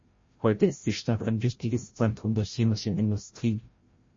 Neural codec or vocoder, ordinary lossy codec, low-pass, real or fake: codec, 16 kHz, 0.5 kbps, FreqCodec, larger model; MP3, 32 kbps; 7.2 kHz; fake